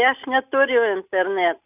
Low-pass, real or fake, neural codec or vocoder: 3.6 kHz; real; none